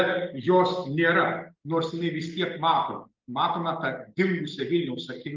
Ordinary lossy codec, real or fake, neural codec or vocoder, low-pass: Opus, 24 kbps; real; none; 7.2 kHz